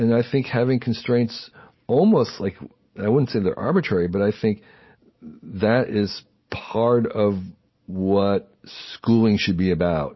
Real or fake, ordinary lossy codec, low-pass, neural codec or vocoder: real; MP3, 24 kbps; 7.2 kHz; none